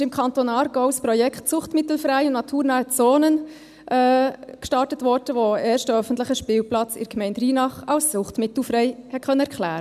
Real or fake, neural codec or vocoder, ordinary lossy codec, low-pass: real; none; none; 14.4 kHz